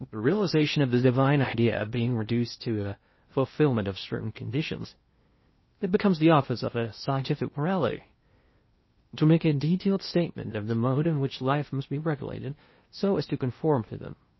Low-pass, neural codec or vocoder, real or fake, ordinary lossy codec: 7.2 kHz; codec, 16 kHz in and 24 kHz out, 0.6 kbps, FocalCodec, streaming, 2048 codes; fake; MP3, 24 kbps